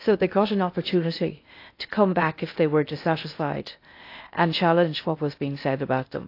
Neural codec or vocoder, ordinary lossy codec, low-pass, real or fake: codec, 16 kHz in and 24 kHz out, 0.6 kbps, FocalCodec, streaming, 2048 codes; AAC, 32 kbps; 5.4 kHz; fake